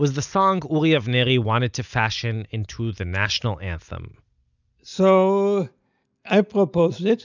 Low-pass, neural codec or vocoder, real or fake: 7.2 kHz; none; real